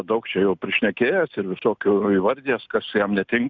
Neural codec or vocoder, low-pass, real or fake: none; 7.2 kHz; real